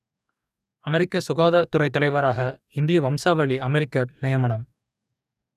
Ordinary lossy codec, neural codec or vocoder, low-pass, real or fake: none; codec, 44.1 kHz, 2.6 kbps, DAC; 14.4 kHz; fake